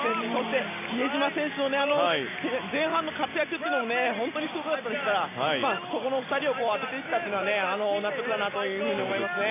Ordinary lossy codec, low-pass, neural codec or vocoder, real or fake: AAC, 16 kbps; 3.6 kHz; none; real